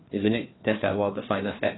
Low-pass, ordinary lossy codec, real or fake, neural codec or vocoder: 7.2 kHz; AAC, 16 kbps; fake; codec, 16 kHz, 2 kbps, FreqCodec, larger model